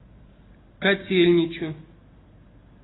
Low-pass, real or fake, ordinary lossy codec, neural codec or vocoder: 7.2 kHz; real; AAC, 16 kbps; none